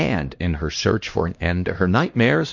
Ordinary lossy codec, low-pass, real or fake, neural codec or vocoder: MP3, 48 kbps; 7.2 kHz; fake; codec, 16 kHz, 1 kbps, X-Codec, HuBERT features, trained on LibriSpeech